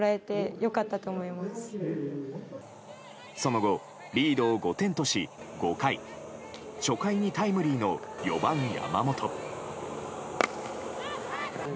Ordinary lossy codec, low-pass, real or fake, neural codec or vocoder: none; none; real; none